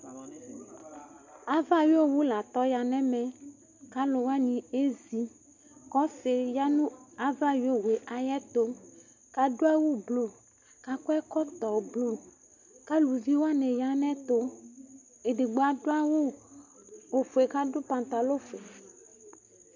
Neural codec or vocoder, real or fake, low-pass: none; real; 7.2 kHz